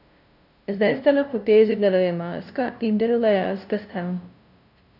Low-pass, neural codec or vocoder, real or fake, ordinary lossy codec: 5.4 kHz; codec, 16 kHz, 0.5 kbps, FunCodec, trained on LibriTTS, 25 frames a second; fake; none